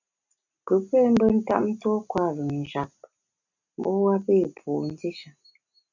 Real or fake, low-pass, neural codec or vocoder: real; 7.2 kHz; none